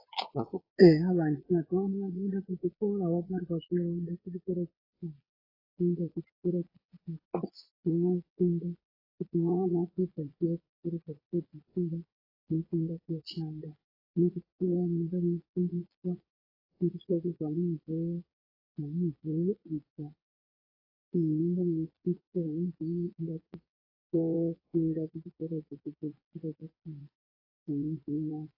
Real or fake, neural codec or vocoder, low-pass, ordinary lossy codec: fake; vocoder, 22.05 kHz, 80 mel bands, Vocos; 5.4 kHz; AAC, 24 kbps